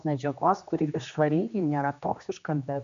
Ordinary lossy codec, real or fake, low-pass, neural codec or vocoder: MP3, 64 kbps; fake; 7.2 kHz; codec, 16 kHz, 2 kbps, X-Codec, HuBERT features, trained on general audio